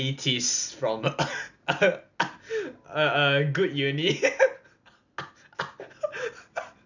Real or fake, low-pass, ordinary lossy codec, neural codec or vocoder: real; 7.2 kHz; none; none